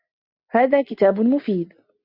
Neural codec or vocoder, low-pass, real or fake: none; 5.4 kHz; real